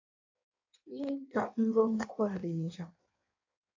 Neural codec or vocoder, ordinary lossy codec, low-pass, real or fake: codec, 16 kHz in and 24 kHz out, 1.1 kbps, FireRedTTS-2 codec; AAC, 48 kbps; 7.2 kHz; fake